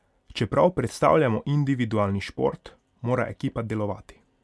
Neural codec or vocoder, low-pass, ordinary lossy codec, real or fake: none; none; none; real